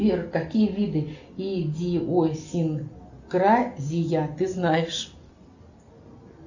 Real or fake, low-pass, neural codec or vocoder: real; 7.2 kHz; none